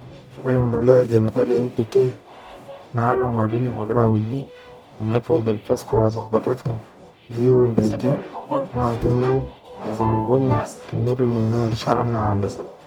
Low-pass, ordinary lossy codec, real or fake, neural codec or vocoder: 19.8 kHz; none; fake; codec, 44.1 kHz, 0.9 kbps, DAC